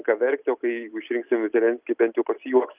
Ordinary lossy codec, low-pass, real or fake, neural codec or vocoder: Opus, 24 kbps; 3.6 kHz; real; none